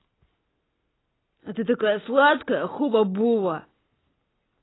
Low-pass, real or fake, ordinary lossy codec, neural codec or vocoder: 7.2 kHz; real; AAC, 16 kbps; none